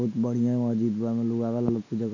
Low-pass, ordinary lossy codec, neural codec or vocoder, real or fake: 7.2 kHz; none; none; real